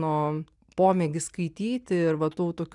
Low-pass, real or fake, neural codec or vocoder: 10.8 kHz; real; none